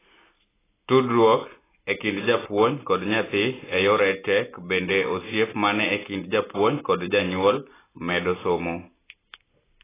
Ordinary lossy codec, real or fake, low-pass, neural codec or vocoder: AAC, 16 kbps; real; 3.6 kHz; none